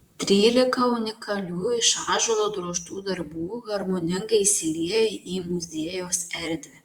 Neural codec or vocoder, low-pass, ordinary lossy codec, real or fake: vocoder, 44.1 kHz, 128 mel bands, Pupu-Vocoder; 19.8 kHz; Opus, 64 kbps; fake